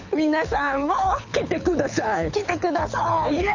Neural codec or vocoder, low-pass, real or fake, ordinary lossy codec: codec, 24 kHz, 6 kbps, HILCodec; 7.2 kHz; fake; none